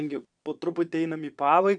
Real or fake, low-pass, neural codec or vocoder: fake; 9.9 kHz; vocoder, 22.05 kHz, 80 mel bands, Vocos